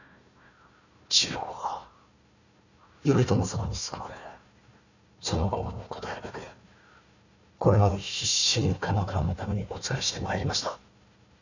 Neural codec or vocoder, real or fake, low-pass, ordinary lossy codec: codec, 16 kHz, 1 kbps, FunCodec, trained on Chinese and English, 50 frames a second; fake; 7.2 kHz; none